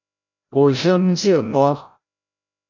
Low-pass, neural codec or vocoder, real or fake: 7.2 kHz; codec, 16 kHz, 0.5 kbps, FreqCodec, larger model; fake